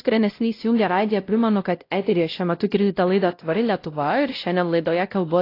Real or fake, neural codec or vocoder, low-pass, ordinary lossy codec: fake; codec, 16 kHz, 0.5 kbps, X-Codec, WavLM features, trained on Multilingual LibriSpeech; 5.4 kHz; AAC, 32 kbps